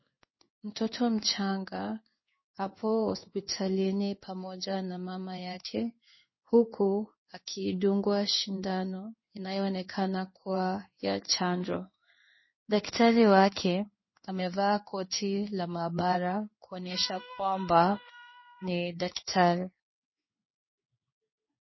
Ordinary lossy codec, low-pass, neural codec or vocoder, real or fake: MP3, 24 kbps; 7.2 kHz; codec, 16 kHz in and 24 kHz out, 1 kbps, XY-Tokenizer; fake